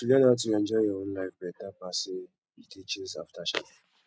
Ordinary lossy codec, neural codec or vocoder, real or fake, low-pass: none; none; real; none